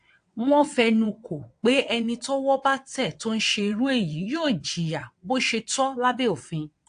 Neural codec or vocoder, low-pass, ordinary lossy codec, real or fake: vocoder, 22.05 kHz, 80 mel bands, WaveNeXt; 9.9 kHz; AAC, 64 kbps; fake